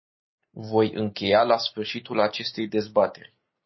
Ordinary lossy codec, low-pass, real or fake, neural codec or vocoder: MP3, 24 kbps; 7.2 kHz; real; none